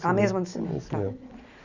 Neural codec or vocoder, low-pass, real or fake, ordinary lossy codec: none; 7.2 kHz; real; none